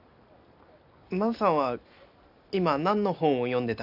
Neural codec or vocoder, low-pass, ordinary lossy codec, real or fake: none; 5.4 kHz; none; real